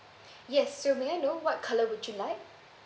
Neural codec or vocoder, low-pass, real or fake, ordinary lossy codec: none; none; real; none